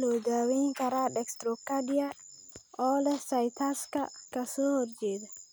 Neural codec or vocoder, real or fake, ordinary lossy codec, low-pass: none; real; none; none